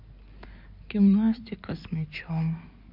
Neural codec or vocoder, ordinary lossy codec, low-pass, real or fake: codec, 16 kHz in and 24 kHz out, 2.2 kbps, FireRedTTS-2 codec; none; 5.4 kHz; fake